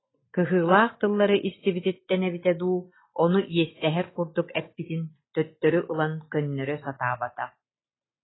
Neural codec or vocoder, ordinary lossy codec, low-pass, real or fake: none; AAC, 16 kbps; 7.2 kHz; real